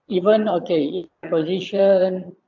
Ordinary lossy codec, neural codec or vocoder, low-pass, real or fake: AAC, 48 kbps; vocoder, 22.05 kHz, 80 mel bands, Vocos; 7.2 kHz; fake